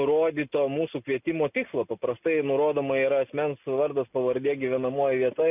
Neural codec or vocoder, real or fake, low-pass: none; real; 3.6 kHz